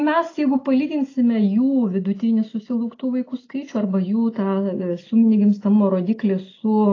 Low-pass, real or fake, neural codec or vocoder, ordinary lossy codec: 7.2 kHz; real; none; AAC, 32 kbps